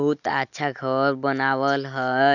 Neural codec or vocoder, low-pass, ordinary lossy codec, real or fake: none; 7.2 kHz; none; real